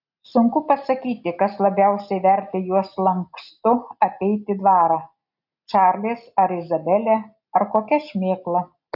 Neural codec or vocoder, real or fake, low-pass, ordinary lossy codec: none; real; 5.4 kHz; AAC, 48 kbps